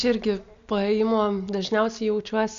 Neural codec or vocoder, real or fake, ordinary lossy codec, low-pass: none; real; MP3, 64 kbps; 7.2 kHz